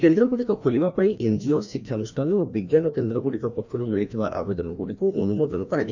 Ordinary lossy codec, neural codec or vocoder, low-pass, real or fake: none; codec, 16 kHz, 1 kbps, FreqCodec, larger model; 7.2 kHz; fake